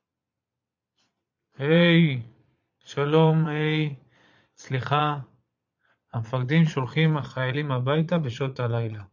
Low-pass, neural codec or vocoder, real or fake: 7.2 kHz; vocoder, 22.05 kHz, 80 mel bands, Vocos; fake